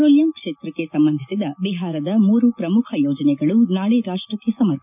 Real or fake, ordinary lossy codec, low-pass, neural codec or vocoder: real; none; 3.6 kHz; none